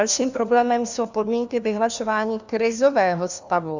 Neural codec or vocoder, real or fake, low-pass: codec, 16 kHz, 1 kbps, FunCodec, trained on LibriTTS, 50 frames a second; fake; 7.2 kHz